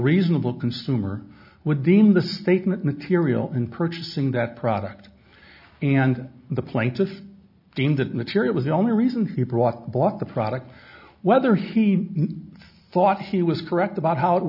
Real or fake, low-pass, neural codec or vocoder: real; 5.4 kHz; none